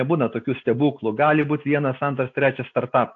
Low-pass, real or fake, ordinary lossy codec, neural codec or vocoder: 7.2 kHz; real; AAC, 48 kbps; none